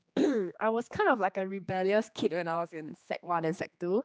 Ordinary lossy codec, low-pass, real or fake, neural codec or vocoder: none; none; fake; codec, 16 kHz, 2 kbps, X-Codec, HuBERT features, trained on general audio